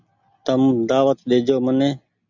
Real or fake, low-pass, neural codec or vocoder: real; 7.2 kHz; none